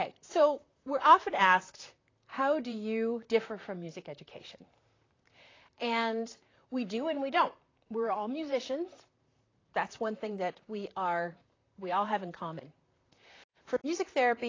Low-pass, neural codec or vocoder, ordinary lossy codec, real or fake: 7.2 kHz; vocoder, 44.1 kHz, 128 mel bands, Pupu-Vocoder; AAC, 32 kbps; fake